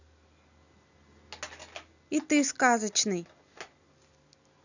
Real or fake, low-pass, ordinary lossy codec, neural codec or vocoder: real; 7.2 kHz; none; none